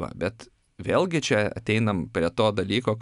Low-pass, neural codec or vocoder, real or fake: 10.8 kHz; none; real